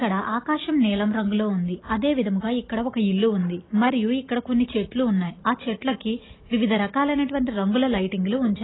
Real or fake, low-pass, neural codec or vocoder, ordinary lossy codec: real; 7.2 kHz; none; AAC, 16 kbps